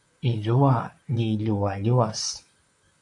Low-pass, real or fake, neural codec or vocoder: 10.8 kHz; fake; vocoder, 44.1 kHz, 128 mel bands, Pupu-Vocoder